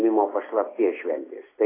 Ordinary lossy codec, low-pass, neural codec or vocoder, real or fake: AAC, 16 kbps; 3.6 kHz; none; real